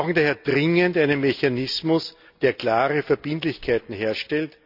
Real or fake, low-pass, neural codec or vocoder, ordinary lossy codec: real; 5.4 kHz; none; none